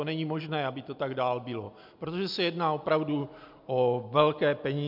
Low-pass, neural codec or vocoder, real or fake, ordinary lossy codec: 5.4 kHz; none; real; MP3, 48 kbps